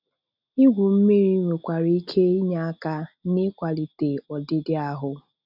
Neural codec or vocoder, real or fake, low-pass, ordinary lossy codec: none; real; 5.4 kHz; none